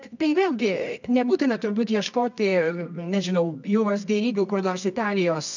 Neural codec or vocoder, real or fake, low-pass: codec, 24 kHz, 0.9 kbps, WavTokenizer, medium music audio release; fake; 7.2 kHz